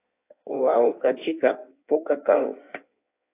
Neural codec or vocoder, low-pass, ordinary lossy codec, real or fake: codec, 16 kHz in and 24 kHz out, 1.1 kbps, FireRedTTS-2 codec; 3.6 kHz; AAC, 24 kbps; fake